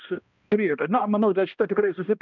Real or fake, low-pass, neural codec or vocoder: fake; 7.2 kHz; codec, 16 kHz, 1 kbps, X-Codec, HuBERT features, trained on balanced general audio